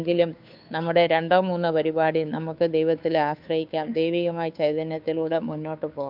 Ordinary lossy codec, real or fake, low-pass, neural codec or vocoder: none; fake; 5.4 kHz; codec, 16 kHz, 2 kbps, FunCodec, trained on Chinese and English, 25 frames a second